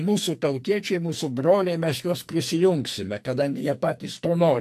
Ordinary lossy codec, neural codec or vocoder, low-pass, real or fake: AAC, 64 kbps; codec, 44.1 kHz, 2.6 kbps, SNAC; 14.4 kHz; fake